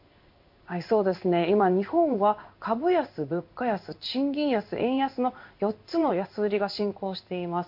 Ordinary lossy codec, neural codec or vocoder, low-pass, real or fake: MP3, 48 kbps; codec, 16 kHz in and 24 kHz out, 1 kbps, XY-Tokenizer; 5.4 kHz; fake